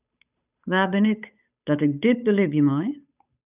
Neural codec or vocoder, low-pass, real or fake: codec, 16 kHz, 8 kbps, FunCodec, trained on Chinese and English, 25 frames a second; 3.6 kHz; fake